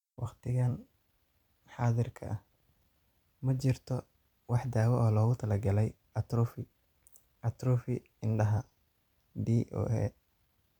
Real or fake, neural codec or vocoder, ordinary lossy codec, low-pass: fake; vocoder, 44.1 kHz, 128 mel bands every 256 samples, BigVGAN v2; none; 19.8 kHz